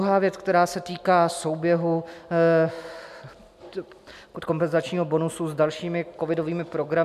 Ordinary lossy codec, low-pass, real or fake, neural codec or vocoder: MP3, 96 kbps; 14.4 kHz; real; none